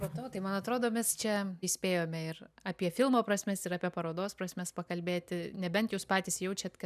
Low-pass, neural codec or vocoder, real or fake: 14.4 kHz; none; real